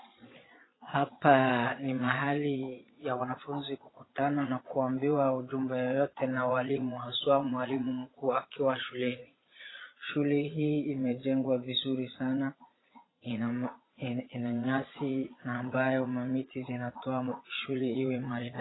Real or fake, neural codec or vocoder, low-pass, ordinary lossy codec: fake; vocoder, 22.05 kHz, 80 mel bands, Vocos; 7.2 kHz; AAC, 16 kbps